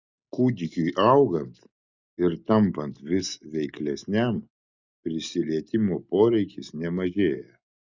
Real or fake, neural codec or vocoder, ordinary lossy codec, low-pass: real; none; Opus, 64 kbps; 7.2 kHz